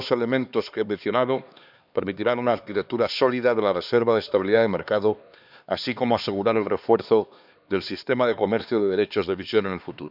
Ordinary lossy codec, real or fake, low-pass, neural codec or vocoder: none; fake; 5.4 kHz; codec, 16 kHz, 2 kbps, X-Codec, HuBERT features, trained on LibriSpeech